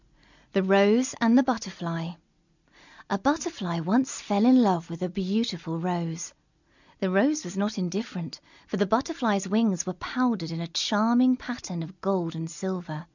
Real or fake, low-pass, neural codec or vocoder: real; 7.2 kHz; none